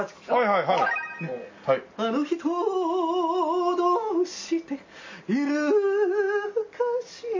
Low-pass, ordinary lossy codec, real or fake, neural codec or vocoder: 7.2 kHz; MP3, 32 kbps; real; none